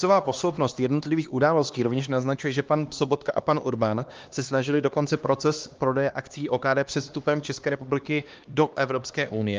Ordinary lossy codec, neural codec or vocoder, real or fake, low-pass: Opus, 32 kbps; codec, 16 kHz, 2 kbps, X-Codec, HuBERT features, trained on LibriSpeech; fake; 7.2 kHz